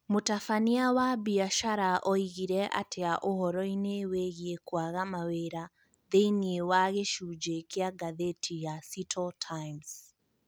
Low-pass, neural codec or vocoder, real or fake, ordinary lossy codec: none; none; real; none